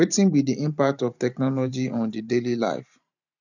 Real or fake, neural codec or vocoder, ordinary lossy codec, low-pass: fake; vocoder, 44.1 kHz, 128 mel bands every 512 samples, BigVGAN v2; none; 7.2 kHz